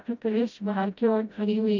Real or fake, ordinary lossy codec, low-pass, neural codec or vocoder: fake; none; 7.2 kHz; codec, 16 kHz, 0.5 kbps, FreqCodec, smaller model